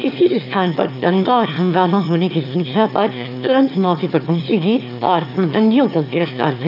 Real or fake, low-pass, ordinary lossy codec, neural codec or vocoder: fake; 5.4 kHz; none; autoencoder, 22.05 kHz, a latent of 192 numbers a frame, VITS, trained on one speaker